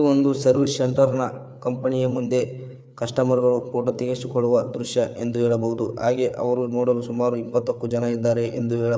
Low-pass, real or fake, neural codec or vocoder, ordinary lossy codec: none; fake; codec, 16 kHz, 4 kbps, FreqCodec, larger model; none